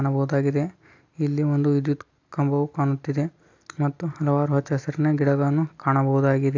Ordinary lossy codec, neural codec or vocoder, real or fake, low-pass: AAC, 48 kbps; none; real; 7.2 kHz